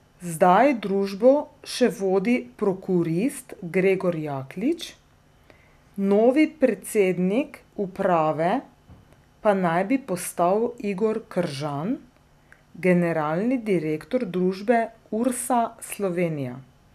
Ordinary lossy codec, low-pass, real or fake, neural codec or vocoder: none; 14.4 kHz; real; none